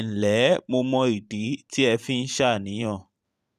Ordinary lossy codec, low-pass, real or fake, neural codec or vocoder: none; 14.4 kHz; fake; vocoder, 44.1 kHz, 128 mel bands every 512 samples, BigVGAN v2